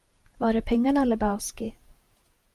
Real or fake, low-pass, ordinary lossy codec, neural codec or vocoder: fake; 14.4 kHz; Opus, 32 kbps; vocoder, 48 kHz, 128 mel bands, Vocos